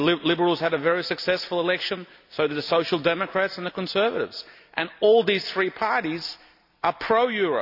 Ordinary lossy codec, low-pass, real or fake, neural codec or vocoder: none; 5.4 kHz; real; none